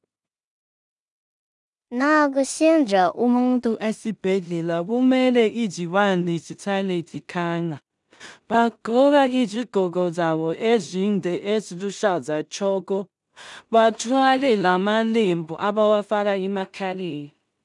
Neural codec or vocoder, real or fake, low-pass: codec, 16 kHz in and 24 kHz out, 0.4 kbps, LongCat-Audio-Codec, two codebook decoder; fake; 10.8 kHz